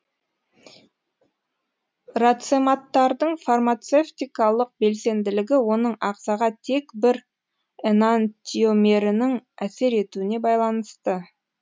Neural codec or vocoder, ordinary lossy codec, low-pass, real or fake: none; none; none; real